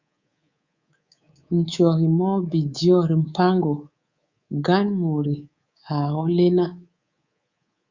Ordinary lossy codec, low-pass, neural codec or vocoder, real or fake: Opus, 64 kbps; 7.2 kHz; codec, 24 kHz, 3.1 kbps, DualCodec; fake